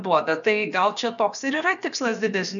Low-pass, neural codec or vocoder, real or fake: 7.2 kHz; codec, 16 kHz, about 1 kbps, DyCAST, with the encoder's durations; fake